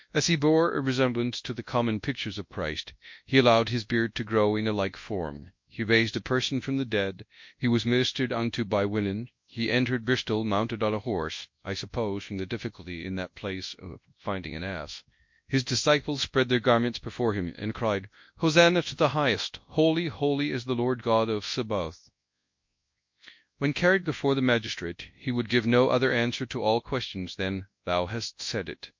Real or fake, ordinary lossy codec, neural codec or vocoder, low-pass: fake; MP3, 48 kbps; codec, 24 kHz, 0.9 kbps, WavTokenizer, large speech release; 7.2 kHz